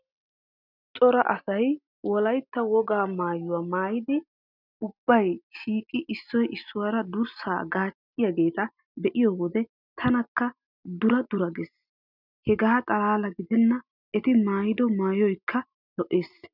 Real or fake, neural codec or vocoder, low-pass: real; none; 5.4 kHz